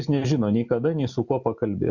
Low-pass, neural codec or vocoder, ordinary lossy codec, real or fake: 7.2 kHz; none; Opus, 64 kbps; real